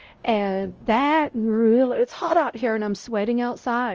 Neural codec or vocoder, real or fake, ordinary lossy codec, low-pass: codec, 16 kHz, 0.5 kbps, X-Codec, WavLM features, trained on Multilingual LibriSpeech; fake; Opus, 24 kbps; 7.2 kHz